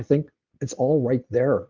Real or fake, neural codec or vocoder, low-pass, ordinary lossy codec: real; none; 7.2 kHz; Opus, 32 kbps